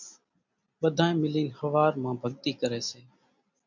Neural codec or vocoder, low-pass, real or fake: none; 7.2 kHz; real